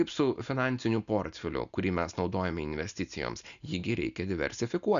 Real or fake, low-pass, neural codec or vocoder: real; 7.2 kHz; none